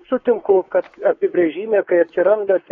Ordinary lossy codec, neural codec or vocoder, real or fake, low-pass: AAC, 32 kbps; codec, 16 kHz, 4 kbps, X-Codec, WavLM features, trained on Multilingual LibriSpeech; fake; 7.2 kHz